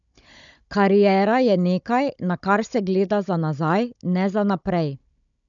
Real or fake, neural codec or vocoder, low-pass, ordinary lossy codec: fake; codec, 16 kHz, 16 kbps, FunCodec, trained on Chinese and English, 50 frames a second; 7.2 kHz; none